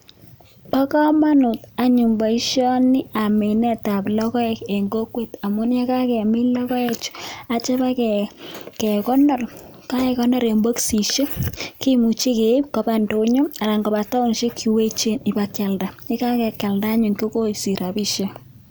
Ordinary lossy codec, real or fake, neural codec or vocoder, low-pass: none; real; none; none